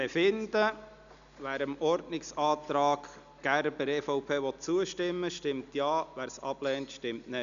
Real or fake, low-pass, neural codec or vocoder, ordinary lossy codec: real; 7.2 kHz; none; none